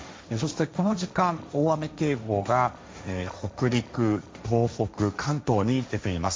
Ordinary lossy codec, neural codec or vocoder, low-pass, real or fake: none; codec, 16 kHz, 1.1 kbps, Voila-Tokenizer; none; fake